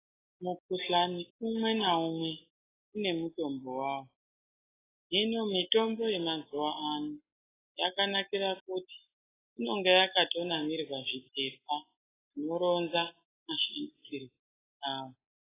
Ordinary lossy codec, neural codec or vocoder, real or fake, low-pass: AAC, 16 kbps; none; real; 3.6 kHz